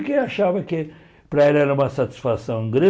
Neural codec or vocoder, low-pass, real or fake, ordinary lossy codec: none; none; real; none